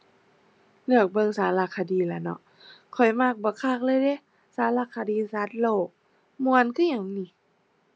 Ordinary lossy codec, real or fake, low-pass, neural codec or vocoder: none; real; none; none